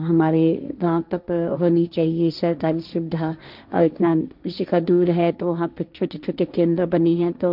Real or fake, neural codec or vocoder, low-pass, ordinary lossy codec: fake; codec, 16 kHz, 1.1 kbps, Voila-Tokenizer; 5.4 kHz; none